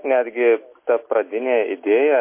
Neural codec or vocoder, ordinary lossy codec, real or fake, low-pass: none; MP3, 24 kbps; real; 3.6 kHz